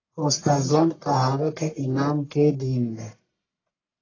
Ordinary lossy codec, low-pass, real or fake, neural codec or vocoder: AAC, 32 kbps; 7.2 kHz; fake; codec, 44.1 kHz, 1.7 kbps, Pupu-Codec